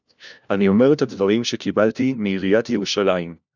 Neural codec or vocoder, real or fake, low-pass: codec, 16 kHz, 1 kbps, FunCodec, trained on LibriTTS, 50 frames a second; fake; 7.2 kHz